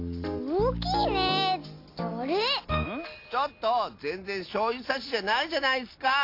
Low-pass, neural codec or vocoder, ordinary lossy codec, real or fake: 5.4 kHz; none; AAC, 32 kbps; real